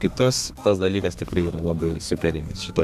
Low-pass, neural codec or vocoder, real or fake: 14.4 kHz; codec, 32 kHz, 1.9 kbps, SNAC; fake